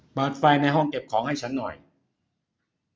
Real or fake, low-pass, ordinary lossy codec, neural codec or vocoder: real; none; none; none